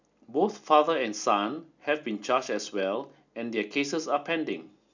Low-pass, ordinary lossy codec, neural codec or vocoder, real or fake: 7.2 kHz; none; none; real